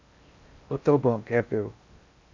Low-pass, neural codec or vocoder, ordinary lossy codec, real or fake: 7.2 kHz; codec, 16 kHz in and 24 kHz out, 0.6 kbps, FocalCodec, streaming, 4096 codes; MP3, 64 kbps; fake